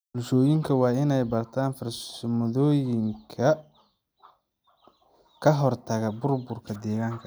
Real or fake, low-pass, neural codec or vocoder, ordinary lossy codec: real; none; none; none